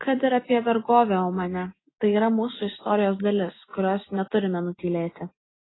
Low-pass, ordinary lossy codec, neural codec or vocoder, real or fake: 7.2 kHz; AAC, 16 kbps; none; real